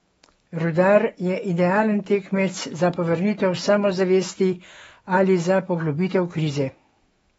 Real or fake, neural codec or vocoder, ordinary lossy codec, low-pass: fake; autoencoder, 48 kHz, 128 numbers a frame, DAC-VAE, trained on Japanese speech; AAC, 24 kbps; 19.8 kHz